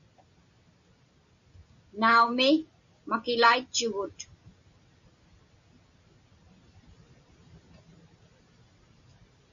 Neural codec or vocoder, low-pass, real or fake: none; 7.2 kHz; real